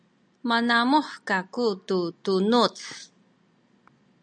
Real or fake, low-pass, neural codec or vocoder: real; 9.9 kHz; none